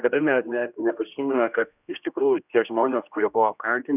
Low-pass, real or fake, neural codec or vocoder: 3.6 kHz; fake; codec, 16 kHz, 1 kbps, X-Codec, HuBERT features, trained on general audio